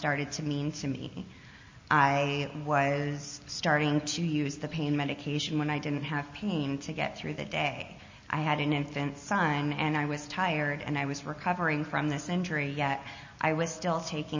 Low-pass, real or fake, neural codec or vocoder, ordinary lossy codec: 7.2 kHz; real; none; MP3, 32 kbps